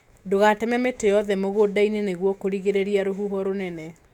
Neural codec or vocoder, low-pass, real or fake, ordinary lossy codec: autoencoder, 48 kHz, 128 numbers a frame, DAC-VAE, trained on Japanese speech; 19.8 kHz; fake; none